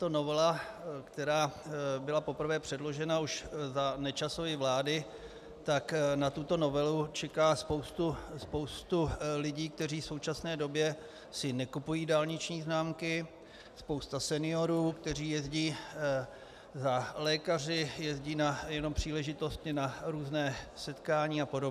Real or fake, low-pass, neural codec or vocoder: real; 14.4 kHz; none